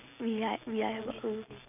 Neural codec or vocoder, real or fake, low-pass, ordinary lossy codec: none; real; 3.6 kHz; none